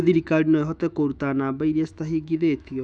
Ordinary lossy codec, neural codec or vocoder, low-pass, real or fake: none; autoencoder, 48 kHz, 128 numbers a frame, DAC-VAE, trained on Japanese speech; 9.9 kHz; fake